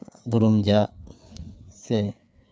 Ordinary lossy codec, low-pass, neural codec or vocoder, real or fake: none; none; codec, 16 kHz, 4 kbps, FreqCodec, larger model; fake